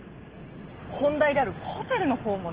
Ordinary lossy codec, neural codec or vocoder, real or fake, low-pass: Opus, 16 kbps; none; real; 3.6 kHz